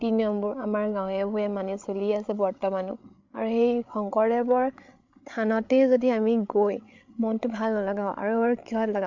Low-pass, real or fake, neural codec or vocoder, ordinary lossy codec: 7.2 kHz; fake; codec, 16 kHz, 8 kbps, FreqCodec, larger model; MP3, 48 kbps